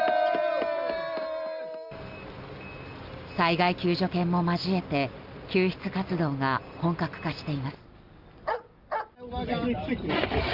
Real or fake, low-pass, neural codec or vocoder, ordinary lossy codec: real; 5.4 kHz; none; Opus, 24 kbps